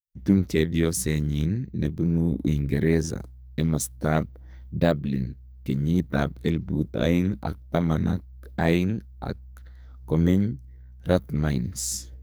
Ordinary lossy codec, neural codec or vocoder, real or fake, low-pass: none; codec, 44.1 kHz, 2.6 kbps, SNAC; fake; none